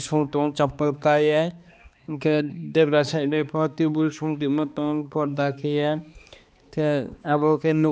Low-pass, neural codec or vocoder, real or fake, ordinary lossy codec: none; codec, 16 kHz, 2 kbps, X-Codec, HuBERT features, trained on balanced general audio; fake; none